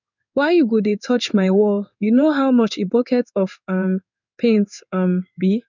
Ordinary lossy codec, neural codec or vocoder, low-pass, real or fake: none; codec, 16 kHz in and 24 kHz out, 1 kbps, XY-Tokenizer; 7.2 kHz; fake